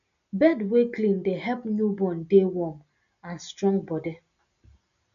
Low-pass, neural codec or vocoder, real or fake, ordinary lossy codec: 7.2 kHz; none; real; none